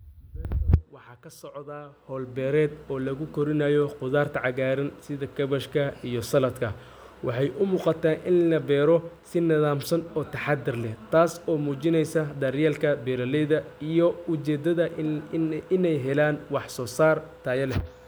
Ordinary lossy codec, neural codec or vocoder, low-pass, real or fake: none; none; none; real